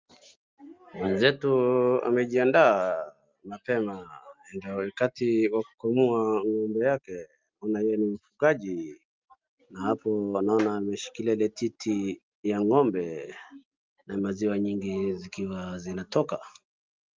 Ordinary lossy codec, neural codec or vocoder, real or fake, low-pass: Opus, 32 kbps; none; real; 7.2 kHz